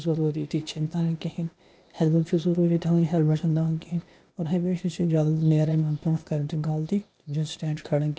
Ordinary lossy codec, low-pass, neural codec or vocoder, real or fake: none; none; codec, 16 kHz, 0.8 kbps, ZipCodec; fake